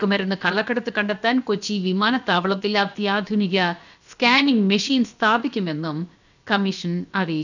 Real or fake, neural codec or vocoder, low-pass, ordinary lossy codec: fake; codec, 16 kHz, about 1 kbps, DyCAST, with the encoder's durations; 7.2 kHz; none